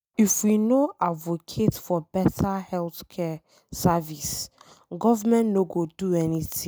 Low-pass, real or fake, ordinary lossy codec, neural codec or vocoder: none; real; none; none